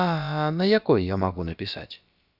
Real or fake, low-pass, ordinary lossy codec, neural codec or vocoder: fake; 5.4 kHz; Opus, 64 kbps; codec, 16 kHz, about 1 kbps, DyCAST, with the encoder's durations